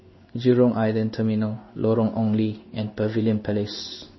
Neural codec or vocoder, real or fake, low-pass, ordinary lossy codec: codec, 16 kHz in and 24 kHz out, 1 kbps, XY-Tokenizer; fake; 7.2 kHz; MP3, 24 kbps